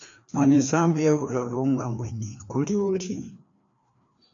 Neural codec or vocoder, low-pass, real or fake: codec, 16 kHz, 2 kbps, FreqCodec, larger model; 7.2 kHz; fake